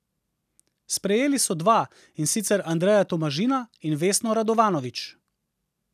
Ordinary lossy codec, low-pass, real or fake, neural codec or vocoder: none; 14.4 kHz; real; none